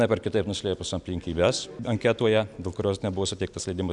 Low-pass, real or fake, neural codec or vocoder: 10.8 kHz; real; none